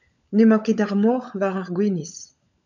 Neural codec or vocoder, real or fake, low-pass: codec, 16 kHz, 16 kbps, FunCodec, trained on LibriTTS, 50 frames a second; fake; 7.2 kHz